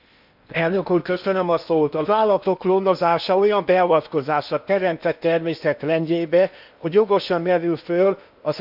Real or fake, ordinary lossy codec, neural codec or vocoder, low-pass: fake; none; codec, 16 kHz in and 24 kHz out, 0.8 kbps, FocalCodec, streaming, 65536 codes; 5.4 kHz